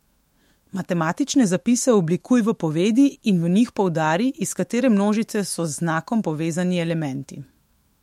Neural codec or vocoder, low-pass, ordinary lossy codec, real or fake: autoencoder, 48 kHz, 128 numbers a frame, DAC-VAE, trained on Japanese speech; 19.8 kHz; MP3, 64 kbps; fake